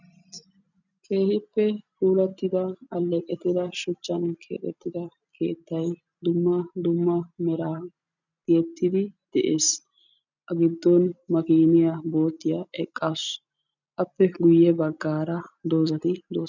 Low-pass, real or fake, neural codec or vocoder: 7.2 kHz; real; none